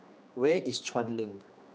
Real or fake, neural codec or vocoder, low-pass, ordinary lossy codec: fake; codec, 16 kHz, 2 kbps, X-Codec, HuBERT features, trained on general audio; none; none